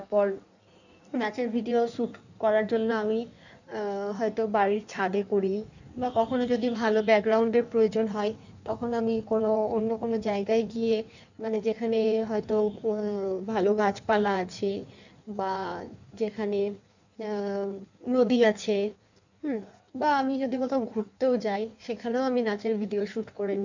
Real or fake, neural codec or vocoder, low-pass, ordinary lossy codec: fake; codec, 16 kHz in and 24 kHz out, 1.1 kbps, FireRedTTS-2 codec; 7.2 kHz; none